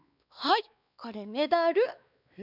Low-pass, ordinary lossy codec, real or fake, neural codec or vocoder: 5.4 kHz; none; fake; codec, 16 kHz, 2 kbps, X-Codec, WavLM features, trained on Multilingual LibriSpeech